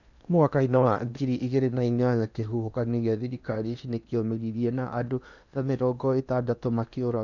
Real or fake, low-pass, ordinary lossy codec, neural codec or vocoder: fake; 7.2 kHz; none; codec, 16 kHz in and 24 kHz out, 0.8 kbps, FocalCodec, streaming, 65536 codes